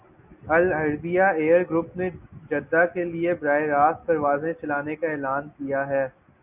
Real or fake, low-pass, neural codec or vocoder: real; 3.6 kHz; none